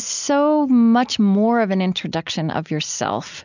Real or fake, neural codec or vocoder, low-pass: real; none; 7.2 kHz